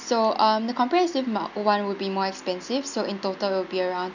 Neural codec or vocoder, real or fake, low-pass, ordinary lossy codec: none; real; 7.2 kHz; none